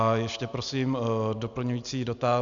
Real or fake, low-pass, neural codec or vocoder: real; 7.2 kHz; none